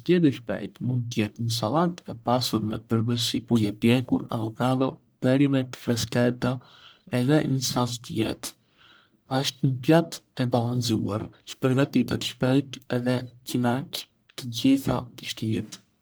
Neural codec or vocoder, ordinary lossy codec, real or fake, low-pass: codec, 44.1 kHz, 1.7 kbps, Pupu-Codec; none; fake; none